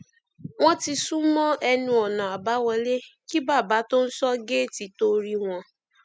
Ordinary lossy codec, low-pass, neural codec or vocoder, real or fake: none; none; none; real